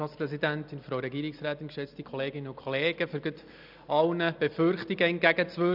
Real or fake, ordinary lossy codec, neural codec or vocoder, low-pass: real; none; none; 5.4 kHz